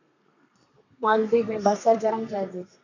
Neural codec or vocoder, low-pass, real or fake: codec, 32 kHz, 1.9 kbps, SNAC; 7.2 kHz; fake